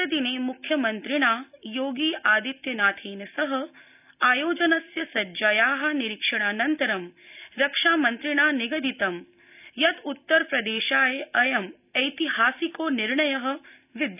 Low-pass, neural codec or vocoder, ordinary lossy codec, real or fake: 3.6 kHz; none; none; real